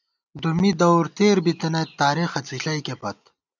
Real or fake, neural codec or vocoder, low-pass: real; none; 7.2 kHz